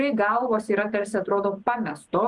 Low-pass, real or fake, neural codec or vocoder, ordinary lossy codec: 10.8 kHz; real; none; Opus, 32 kbps